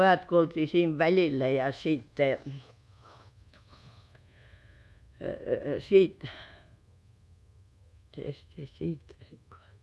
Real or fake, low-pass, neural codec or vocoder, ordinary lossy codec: fake; none; codec, 24 kHz, 1.2 kbps, DualCodec; none